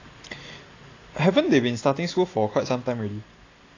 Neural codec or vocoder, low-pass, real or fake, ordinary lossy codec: none; 7.2 kHz; real; AAC, 32 kbps